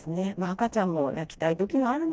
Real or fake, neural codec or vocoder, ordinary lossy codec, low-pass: fake; codec, 16 kHz, 1 kbps, FreqCodec, smaller model; none; none